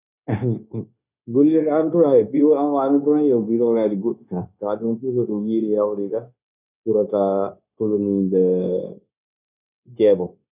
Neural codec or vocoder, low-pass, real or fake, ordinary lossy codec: codec, 16 kHz, 0.9 kbps, LongCat-Audio-Codec; 3.6 kHz; fake; none